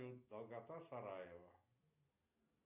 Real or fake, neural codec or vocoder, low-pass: real; none; 3.6 kHz